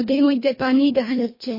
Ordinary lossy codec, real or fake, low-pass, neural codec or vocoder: MP3, 24 kbps; fake; 5.4 kHz; codec, 24 kHz, 1.5 kbps, HILCodec